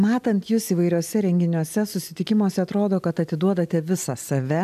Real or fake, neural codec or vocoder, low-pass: real; none; 14.4 kHz